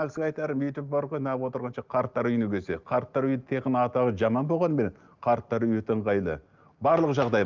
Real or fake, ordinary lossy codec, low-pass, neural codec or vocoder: real; Opus, 32 kbps; 7.2 kHz; none